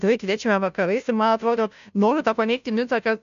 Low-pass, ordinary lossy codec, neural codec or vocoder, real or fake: 7.2 kHz; MP3, 96 kbps; codec, 16 kHz, 0.5 kbps, FunCodec, trained on Chinese and English, 25 frames a second; fake